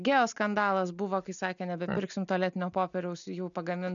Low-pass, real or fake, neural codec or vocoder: 7.2 kHz; real; none